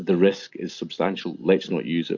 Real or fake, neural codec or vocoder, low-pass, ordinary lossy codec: real; none; 7.2 kHz; Opus, 64 kbps